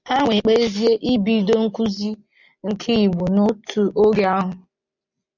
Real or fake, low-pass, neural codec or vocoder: real; 7.2 kHz; none